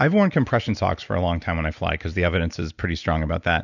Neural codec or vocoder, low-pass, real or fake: none; 7.2 kHz; real